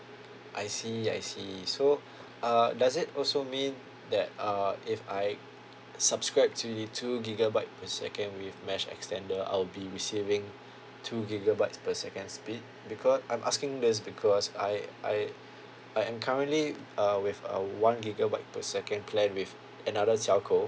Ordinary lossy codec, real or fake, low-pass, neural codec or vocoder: none; real; none; none